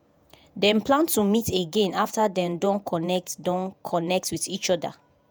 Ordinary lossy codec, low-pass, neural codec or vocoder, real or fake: none; none; vocoder, 48 kHz, 128 mel bands, Vocos; fake